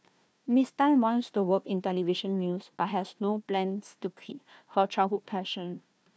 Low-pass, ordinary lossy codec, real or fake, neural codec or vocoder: none; none; fake; codec, 16 kHz, 1 kbps, FunCodec, trained on Chinese and English, 50 frames a second